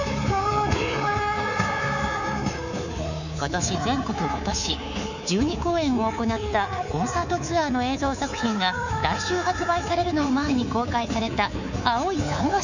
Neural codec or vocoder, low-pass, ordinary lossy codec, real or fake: codec, 24 kHz, 3.1 kbps, DualCodec; 7.2 kHz; none; fake